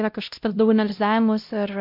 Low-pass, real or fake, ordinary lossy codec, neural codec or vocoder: 5.4 kHz; fake; MP3, 48 kbps; codec, 16 kHz, 0.5 kbps, X-Codec, WavLM features, trained on Multilingual LibriSpeech